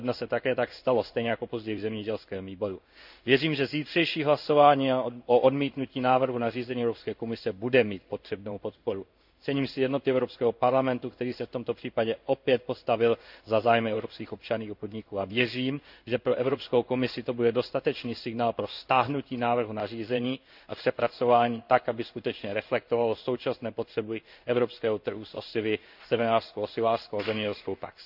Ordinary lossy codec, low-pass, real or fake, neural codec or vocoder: none; 5.4 kHz; fake; codec, 16 kHz in and 24 kHz out, 1 kbps, XY-Tokenizer